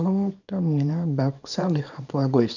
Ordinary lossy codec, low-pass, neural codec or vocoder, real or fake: none; 7.2 kHz; codec, 24 kHz, 0.9 kbps, WavTokenizer, small release; fake